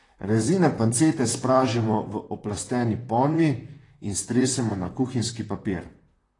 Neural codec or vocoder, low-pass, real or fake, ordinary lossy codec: vocoder, 44.1 kHz, 128 mel bands, Pupu-Vocoder; 10.8 kHz; fake; AAC, 32 kbps